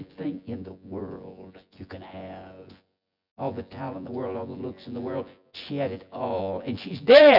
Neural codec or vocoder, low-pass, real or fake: vocoder, 24 kHz, 100 mel bands, Vocos; 5.4 kHz; fake